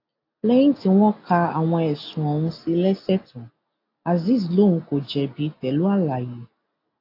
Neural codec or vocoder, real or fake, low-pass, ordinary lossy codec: none; real; 5.4 kHz; AAC, 32 kbps